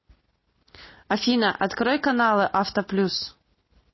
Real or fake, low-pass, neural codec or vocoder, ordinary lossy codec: real; 7.2 kHz; none; MP3, 24 kbps